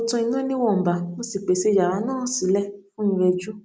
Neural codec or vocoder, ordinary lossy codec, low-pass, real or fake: none; none; none; real